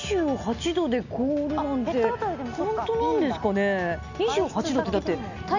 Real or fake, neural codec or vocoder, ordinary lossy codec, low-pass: real; none; none; 7.2 kHz